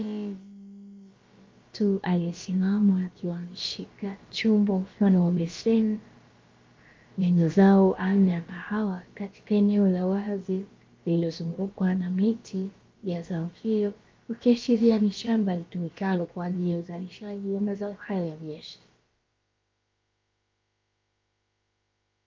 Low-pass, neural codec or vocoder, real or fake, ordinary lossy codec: 7.2 kHz; codec, 16 kHz, about 1 kbps, DyCAST, with the encoder's durations; fake; Opus, 24 kbps